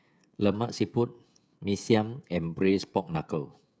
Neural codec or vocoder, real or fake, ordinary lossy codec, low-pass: codec, 16 kHz, 16 kbps, FreqCodec, smaller model; fake; none; none